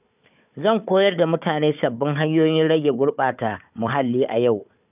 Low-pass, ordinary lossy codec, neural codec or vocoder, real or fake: 3.6 kHz; none; codec, 16 kHz, 4 kbps, FunCodec, trained on Chinese and English, 50 frames a second; fake